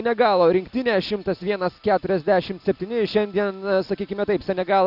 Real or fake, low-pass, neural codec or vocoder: real; 5.4 kHz; none